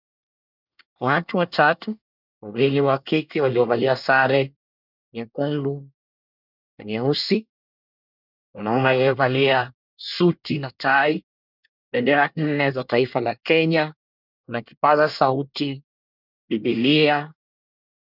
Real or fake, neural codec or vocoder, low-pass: fake; codec, 24 kHz, 1 kbps, SNAC; 5.4 kHz